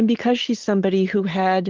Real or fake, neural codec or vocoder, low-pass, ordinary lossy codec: real; none; 7.2 kHz; Opus, 16 kbps